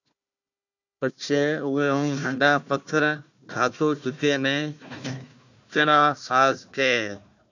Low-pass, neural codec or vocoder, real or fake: 7.2 kHz; codec, 16 kHz, 1 kbps, FunCodec, trained on Chinese and English, 50 frames a second; fake